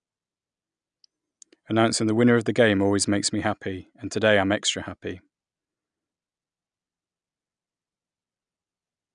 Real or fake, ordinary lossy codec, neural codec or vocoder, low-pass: real; none; none; 9.9 kHz